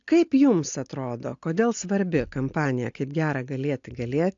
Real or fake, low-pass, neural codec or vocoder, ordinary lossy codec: real; 7.2 kHz; none; AAC, 64 kbps